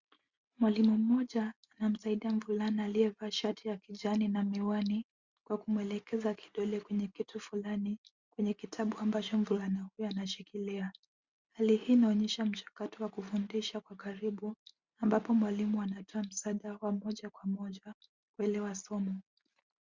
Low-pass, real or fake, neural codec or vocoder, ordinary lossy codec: 7.2 kHz; real; none; Opus, 64 kbps